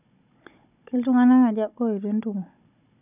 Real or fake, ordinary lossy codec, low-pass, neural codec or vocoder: fake; none; 3.6 kHz; codec, 16 kHz, 16 kbps, FunCodec, trained on Chinese and English, 50 frames a second